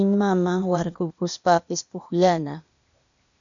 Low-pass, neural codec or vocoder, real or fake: 7.2 kHz; codec, 16 kHz, 0.8 kbps, ZipCodec; fake